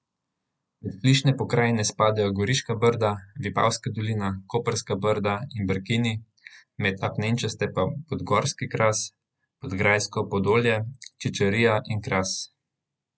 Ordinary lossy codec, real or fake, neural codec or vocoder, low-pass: none; real; none; none